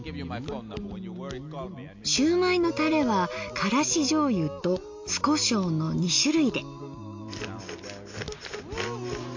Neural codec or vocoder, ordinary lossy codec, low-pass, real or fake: none; MP3, 48 kbps; 7.2 kHz; real